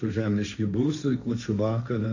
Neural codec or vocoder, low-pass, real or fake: codec, 16 kHz, 1.1 kbps, Voila-Tokenizer; 7.2 kHz; fake